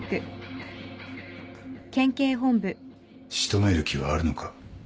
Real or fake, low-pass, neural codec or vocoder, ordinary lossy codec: real; none; none; none